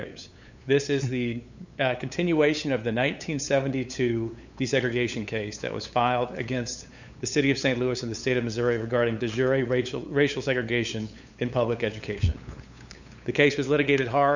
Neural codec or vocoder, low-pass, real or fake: codec, 16 kHz, 8 kbps, FunCodec, trained on LibriTTS, 25 frames a second; 7.2 kHz; fake